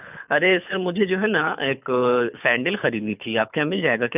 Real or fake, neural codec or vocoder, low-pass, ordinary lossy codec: fake; codec, 24 kHz, 6 kbps, HILCodec; 3.6 kHz; none